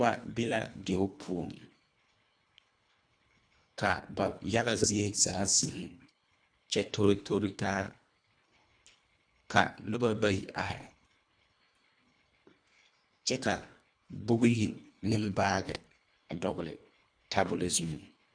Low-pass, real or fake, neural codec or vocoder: 9.9 kHz; fake; codec, 24 kHz, 1.5 kbps, HILCodec